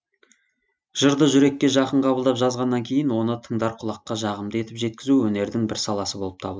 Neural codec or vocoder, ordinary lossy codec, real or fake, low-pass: none; none; real; none